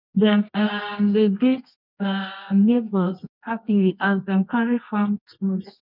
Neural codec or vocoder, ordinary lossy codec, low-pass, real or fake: codec, 24 kHz, 0.9 kbps, WavTokenizer, medium music audio release; none; 5.4 kHz; fake